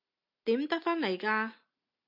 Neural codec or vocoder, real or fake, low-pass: none; real; 5.4 kHz